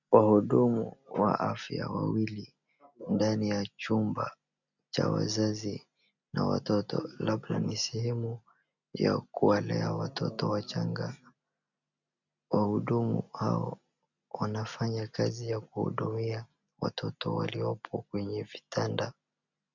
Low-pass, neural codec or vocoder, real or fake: 7.2 kHz; none; real